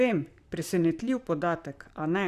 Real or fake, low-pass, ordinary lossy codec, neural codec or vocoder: fake; 14.4 kHz; none; vocoder, 44.1 kHz, 128 mel bands, Pupu-Vocoder